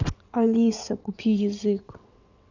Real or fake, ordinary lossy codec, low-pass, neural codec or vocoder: fake; none; 7.2 kHz; vocoder, 22.05 kHz, 80 mel bands, WaveNeXt